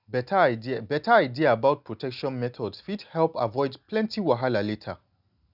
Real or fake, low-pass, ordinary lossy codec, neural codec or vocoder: real; 5.4 kHz; none; none